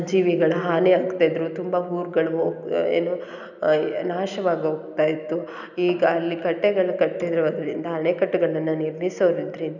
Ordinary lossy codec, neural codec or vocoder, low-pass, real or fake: none; none; 7.2 kHz; real